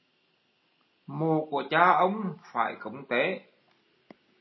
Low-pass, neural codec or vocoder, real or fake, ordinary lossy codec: 7.2 kHz; none; real; MP3, 24 kbps